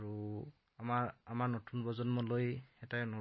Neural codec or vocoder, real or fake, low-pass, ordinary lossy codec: none; real; 7.2 kHz; MP3, 24 kbps